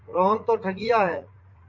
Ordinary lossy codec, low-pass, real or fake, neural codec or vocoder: MP3, 64 kbps; 7.2 kHz; fake; vocoder, 44.1 kHz, 80 mel bands, Vocos